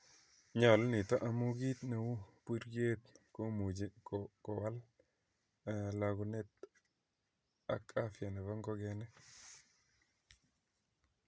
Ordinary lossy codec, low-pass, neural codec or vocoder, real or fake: none; none; none; real